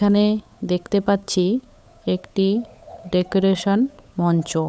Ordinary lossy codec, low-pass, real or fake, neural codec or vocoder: none; none; fake; codec, 16 kHz, 8 kbps, FunCodec, trained on Chinese and English, 25 frames a second